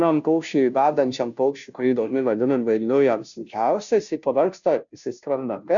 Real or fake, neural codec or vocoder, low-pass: fake; codec, 16 kHz, 0.5 kbps, FunCodec, trained on Chinese and English, 25 frames a second; 7.2 kHz